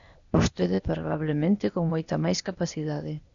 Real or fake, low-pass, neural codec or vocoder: fake; 7.2 kHz; codec, 16 kHz, 0.8 kbps, ZipCodec